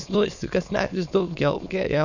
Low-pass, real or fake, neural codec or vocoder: 7.2 kHz; fake; autoencoder, 22.05 kHz, a latent of 192 numbers a frame, VITS, trained on many speakers